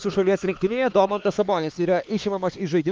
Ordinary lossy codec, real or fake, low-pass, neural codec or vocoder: Opus, 32 kbps; fake; 7.2 kHz; codec, 16 kHz, 4 kbps, X-Codec, HuBERT features, trained on LibriSpeech